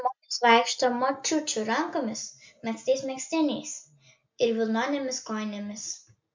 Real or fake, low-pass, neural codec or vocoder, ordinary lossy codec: real; 7.2 kHz; none; MP3, 64 kbps